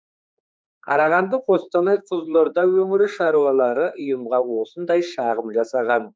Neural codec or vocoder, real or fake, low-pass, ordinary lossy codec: codec, 16 kHz, 4 kbps, X-Codec, HuBERT features, trained on general audio; fake; none; none